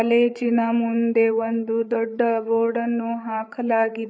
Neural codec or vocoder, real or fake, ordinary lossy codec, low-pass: codec, 16 kHz, 8 kbps, FreqCodec, larger model; fake; none; none